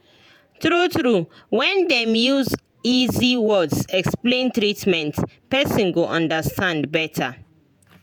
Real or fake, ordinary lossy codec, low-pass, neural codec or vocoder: fake; none; none; vocoder, 48 kHz, 128 mel bands, Vocos